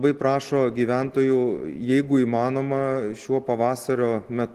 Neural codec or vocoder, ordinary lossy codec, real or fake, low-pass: none; Opus, 16 kbps; real; 14.4 kHz